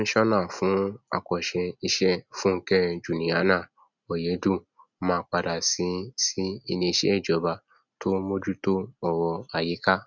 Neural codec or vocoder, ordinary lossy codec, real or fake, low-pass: none; none; real; 7.2 kHz